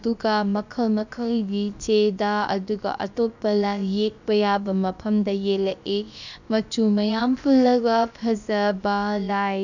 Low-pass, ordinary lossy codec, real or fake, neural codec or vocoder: 7.2 kHz; none; fake; codec, 16 kHz, about 1 kbps, DyCAST, with the encoder's durations